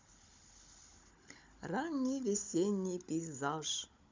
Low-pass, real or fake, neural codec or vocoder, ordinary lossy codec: 7.2 kHz; fake; codec, 16 kHz, 16 kbps, FunCodec, trained on Chinese and English, 50 frames a second; MP3, 64 kbps